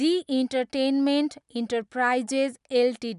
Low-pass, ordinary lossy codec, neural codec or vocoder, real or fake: 10.8 kHz; none; none; real